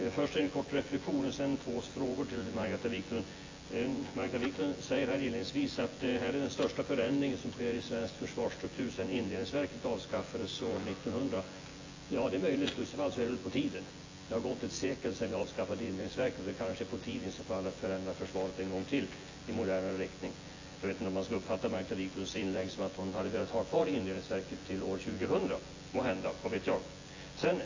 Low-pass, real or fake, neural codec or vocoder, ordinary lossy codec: 7.2 kHz; fake; vocoder, 24 kHz, 100 mel bands, Vocos; AAC, 32 kbps